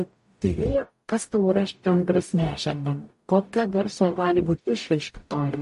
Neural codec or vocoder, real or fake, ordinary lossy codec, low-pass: codec, 44.1 kHz, 0.9 kbps, DAC; fake; MP3, 48 kbps; 14.4 kHz